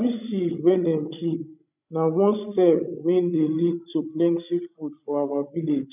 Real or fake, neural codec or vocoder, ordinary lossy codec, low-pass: fake; codec, 16 kHz, 16 kbps, FreqCodec, larger model; none; 3.6 kHz